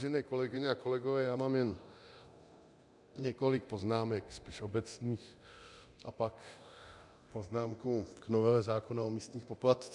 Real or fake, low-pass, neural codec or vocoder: fake; 10.8 kHz; codec, 24 kHz, 0.9 kbps, DualCodec